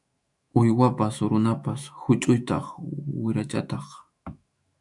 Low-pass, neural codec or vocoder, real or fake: 10.8 kHz; autoencoder, 48 kHz, 128 numbers a frame, DAC-VAE, trained on Japanese speech; fake